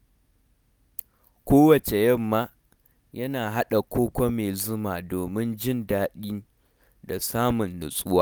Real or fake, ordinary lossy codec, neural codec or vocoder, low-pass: real; none; none; none